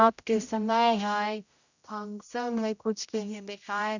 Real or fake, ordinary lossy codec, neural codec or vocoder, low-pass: fake; none; codec, 16 kHz, 0.5 kbps, X-Codec, HuBERT features, trained on general audio; 7.2 kHz